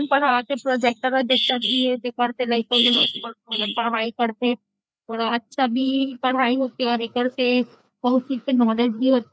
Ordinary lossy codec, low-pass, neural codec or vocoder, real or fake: none; none; codec, 16 kHz, 2 kbps, FreqCodec, larger model; fake